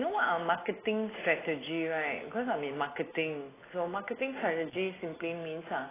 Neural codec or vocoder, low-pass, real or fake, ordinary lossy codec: none; 3.6 kHz; real; AAC, 16 kbps